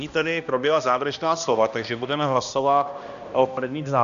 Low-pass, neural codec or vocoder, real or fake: 7.2 kHz; codec, 16 kHz, 1 kbps, X-Codec, HuBERT features, trained on balanced general audio; fake